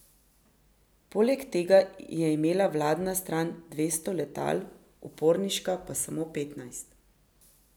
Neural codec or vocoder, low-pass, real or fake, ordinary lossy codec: none; none; real; none